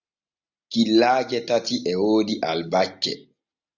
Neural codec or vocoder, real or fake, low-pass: none; real; 7.2 kHz